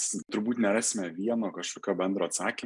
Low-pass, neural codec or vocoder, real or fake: 10.8 kHz; none; real